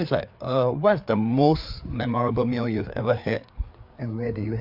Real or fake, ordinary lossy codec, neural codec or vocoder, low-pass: fake; MP3, 48 kbps; codec, 16 kHz, 4 kbps, FreqCodec, larger model; 5.4 kHz